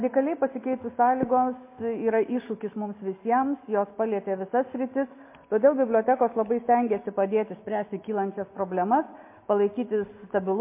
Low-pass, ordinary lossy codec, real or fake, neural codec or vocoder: 3.6 kHz; MP3, 24 kbps; real; none